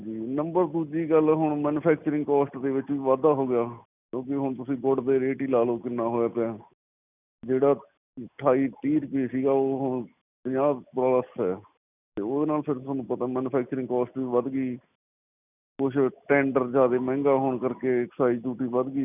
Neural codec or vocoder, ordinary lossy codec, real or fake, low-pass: none; none; real; 3.6 kHz